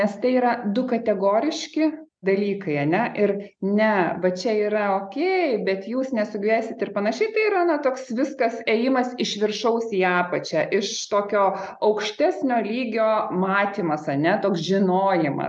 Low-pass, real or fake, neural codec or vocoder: 9.9 kHz; real; none